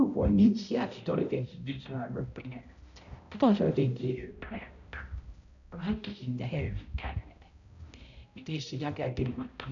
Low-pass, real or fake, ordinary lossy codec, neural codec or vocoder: 7.2 kHz; fake; none; codec, 16 kHz, 0.5 kbps, X-Codec, HuBERT features, trained on balanced general audio